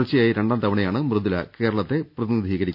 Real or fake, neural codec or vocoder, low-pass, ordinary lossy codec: real; none; 5.4 kHz; none